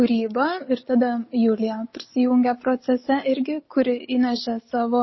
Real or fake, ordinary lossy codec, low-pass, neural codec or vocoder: real; MP3, 24 kbps; 7.2 kHz; none